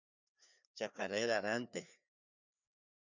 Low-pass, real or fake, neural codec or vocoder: 7.2 kHz; fake; codec, 16 kHz, 2 kbps, FreqCodec, larger model